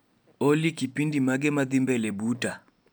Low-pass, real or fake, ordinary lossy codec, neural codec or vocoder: none; real; none; none